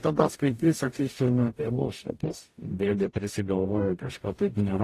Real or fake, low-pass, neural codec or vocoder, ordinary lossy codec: fake; 14.4 kHz; codec, 44.1 kHz, 0.9 kbps, DAC; AAC, 48 kbps